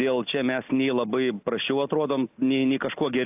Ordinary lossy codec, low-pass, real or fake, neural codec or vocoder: AAC, 32 kbps; 3.6 kHz; real; none